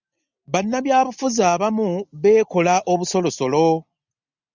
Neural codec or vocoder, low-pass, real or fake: none; 7.2 kHz; real